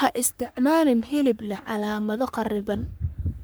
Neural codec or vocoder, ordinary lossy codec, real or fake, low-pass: codec, 44.1 kHz, 3.4 kbps, Pupu-Codec; none; fake; none